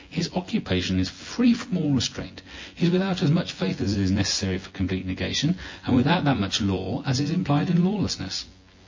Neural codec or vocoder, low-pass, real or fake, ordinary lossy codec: vocoder, 24 kHz, 100 mel bands, Vocos; 7.2 kHz; fake; MP3, 32 kbps